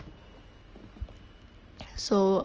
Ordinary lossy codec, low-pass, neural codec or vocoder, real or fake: Opus, 24 kbps; 7.2 kHz; none; real